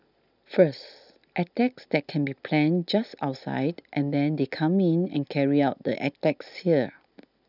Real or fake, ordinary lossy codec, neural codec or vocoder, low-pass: real; none; none; 5.4 kHz